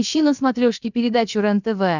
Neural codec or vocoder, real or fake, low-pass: codec, 16 kHz, about 1 kbps, DyCAST, with the encoder's durations; fake; 7.2 kHz